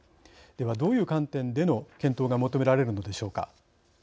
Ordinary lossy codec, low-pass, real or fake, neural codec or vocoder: none; none; real; none